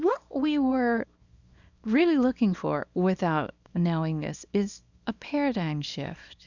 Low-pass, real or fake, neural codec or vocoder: 7.2 kHz; fake; codec, 24 kHz, 0.9 kbps, WavTokenizer, small release